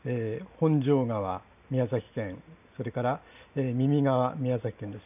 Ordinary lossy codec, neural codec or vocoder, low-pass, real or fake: none; none; 3.6 kHz; real